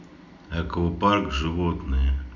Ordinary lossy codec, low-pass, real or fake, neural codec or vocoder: none; 7.2 kHz; real; none